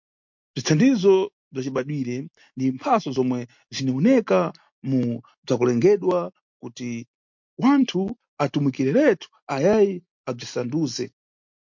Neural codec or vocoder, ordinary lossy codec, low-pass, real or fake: none; MP3, 48 kbps; 7.2 kHz; real